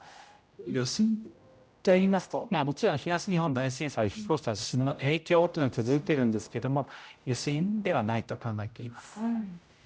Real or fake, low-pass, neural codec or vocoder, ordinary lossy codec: fake; none; codec, 16 kHz, 0.5 kbps, X-Codec, HuBERT features, trained on general audio; none